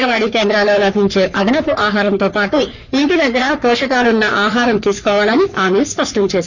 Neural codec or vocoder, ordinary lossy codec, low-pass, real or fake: codec, 44.1 kHz, 3.4 kbps, Pupu-Codec; none; 7.2 kHz; fake